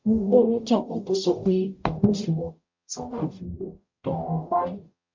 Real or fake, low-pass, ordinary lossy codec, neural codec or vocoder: fake; 7.2 kHz; MP3, 48 kbps; codec, 44.1 kHz, 0.9 kbps, DAC